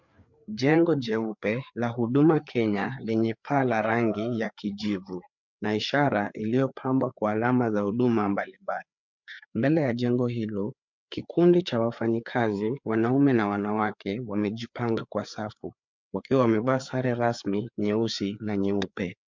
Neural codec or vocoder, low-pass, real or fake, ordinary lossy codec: codec, 16 kHz, 4 kbps, FreqCodec, larger model; 7.2 kHz; fake; MP3, 64 kbps